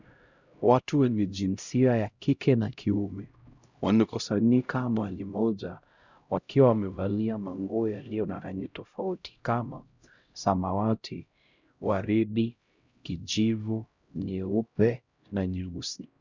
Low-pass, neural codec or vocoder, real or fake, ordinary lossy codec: 7.2 kHz; codec, 16 kHz, 0.5 kbps, X-Codec, HuBERT features, trained on LibriSpeech; fake; Opus, 64 kbps